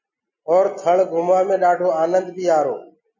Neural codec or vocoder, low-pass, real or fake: none; 7.2 kHz; real